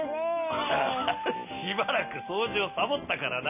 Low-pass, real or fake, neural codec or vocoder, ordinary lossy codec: 3.6 kHz; real; none; none